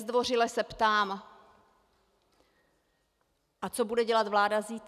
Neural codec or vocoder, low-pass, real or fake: none; 14.4 kHz; real